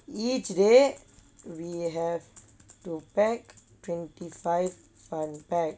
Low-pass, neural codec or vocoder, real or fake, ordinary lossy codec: none; none; real; none